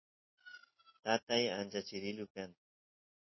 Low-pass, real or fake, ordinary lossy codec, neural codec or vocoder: 7.2 kHz; real; MP3, 24 kbps; none